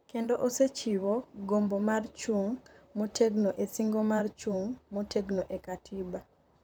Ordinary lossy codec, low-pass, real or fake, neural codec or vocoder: none; none; fake; vocoder, 44.1 kHz, 128 mel bands, Pupu-Vocoder